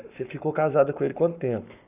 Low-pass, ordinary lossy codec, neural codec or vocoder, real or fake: 3.6 kHz; none; codec, 44.1 kHz, 7.8 kbps, Pupu-Codec; fake